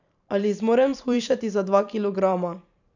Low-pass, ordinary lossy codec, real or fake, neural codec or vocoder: 7.2 kHz; none; fake; vocoder, 44.1 kHz, 128 mel bands every 512 samples, BigVGAN v2